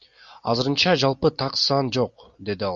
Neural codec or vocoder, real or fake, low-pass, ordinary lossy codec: none; real; 7.2 kHz; Opus, 64 kbps